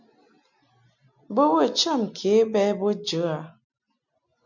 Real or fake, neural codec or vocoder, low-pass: real; none; 7.2 kHz